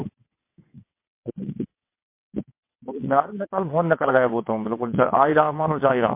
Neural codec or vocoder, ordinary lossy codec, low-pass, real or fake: vocoder, 22.05 kHz, 80 mel bands, WaveNeXt; MP3, 24 kbps; 3.6 kHz; fake